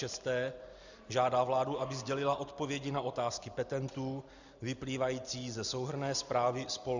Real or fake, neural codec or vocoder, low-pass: real; none; 7.2 kHz